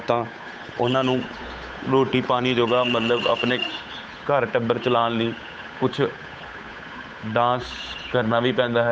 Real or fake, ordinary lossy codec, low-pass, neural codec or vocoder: fake; none; none; codec, 16 kHz, 8 kbps, FunCodec, trained on Chinese and English, 25 frames a second